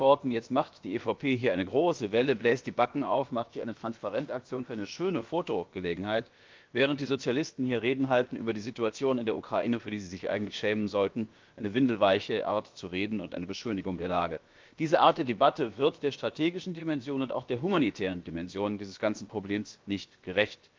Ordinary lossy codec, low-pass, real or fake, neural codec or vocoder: Opus, 24 kbps; 7.2 kHz; fake; codec, 16 kHz, about 1 kbps, DyCAST, with the encoder's durations